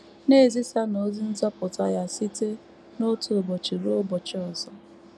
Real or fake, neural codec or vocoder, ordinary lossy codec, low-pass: real; none; none; none